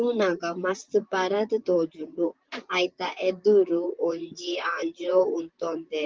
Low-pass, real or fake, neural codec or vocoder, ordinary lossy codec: 7.2 kHz; fake; vocoder, 44.1 kHz, 128 mel bands, Pupu-Vocoder; Opus, 32 kbps